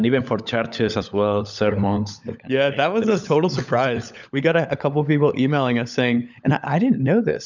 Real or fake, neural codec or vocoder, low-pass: fake; codec, 16 kHz, 16 kbps, FunCodec, trained on LibriTTS, 50 frames a second; 7.2 kHz